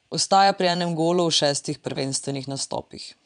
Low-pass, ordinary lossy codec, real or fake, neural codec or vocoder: 9.9 kHz; none; fake; vocoder, 22.05 kHz, 80 mel bands, Vocos